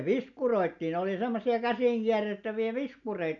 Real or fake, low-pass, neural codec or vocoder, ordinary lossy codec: real; 7.2 kHz; none; none